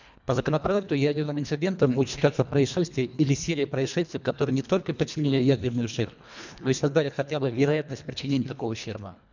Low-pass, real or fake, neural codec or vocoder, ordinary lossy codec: 7.2 kHz; fake; codec, 24 kHz, 1.5 kbps, HILCodec; none